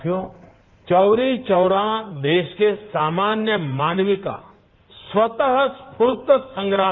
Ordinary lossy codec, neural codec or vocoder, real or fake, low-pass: none; codec, 16 kHz in and 24 kHz out, 2.2 kbps, FireRedTTS-2 codec; fake; 7.2 kHz